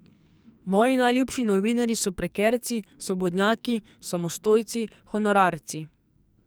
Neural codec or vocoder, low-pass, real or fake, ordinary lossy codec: codec, 44.1 kHz, 2.6 kbps, SNAC; none; fake; none